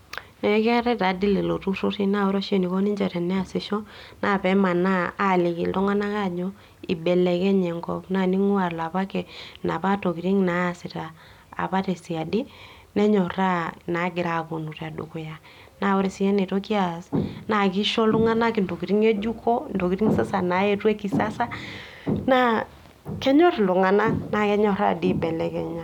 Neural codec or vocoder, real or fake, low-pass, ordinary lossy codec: none; real; 19.8 kHz; none